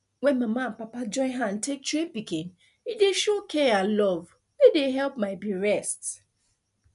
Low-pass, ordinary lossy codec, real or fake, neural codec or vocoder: 10.8 kHz; none; real; none